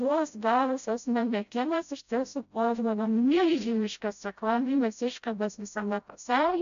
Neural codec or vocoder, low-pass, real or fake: codec, 16 kHz, 0.5 kbps, FreqCodec, smaller model; 7.2 kHz; fake